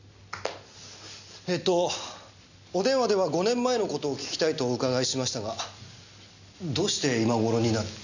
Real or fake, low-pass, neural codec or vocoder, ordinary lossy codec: real; 7.2 kHz; none; none